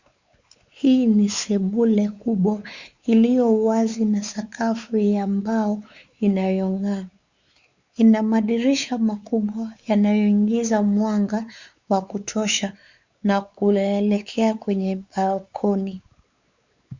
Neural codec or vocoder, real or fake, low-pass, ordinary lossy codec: codec, 16 kHz, 4 kbps, X-Codec, WavLM features, trained on Multilingual LibriSpeech; fake; 7.2 kHz; Opus, 64 kbps